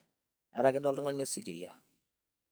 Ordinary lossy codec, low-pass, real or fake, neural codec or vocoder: none; none; fake; codec, 44.1 kHz, 2.6 kbps, SNAC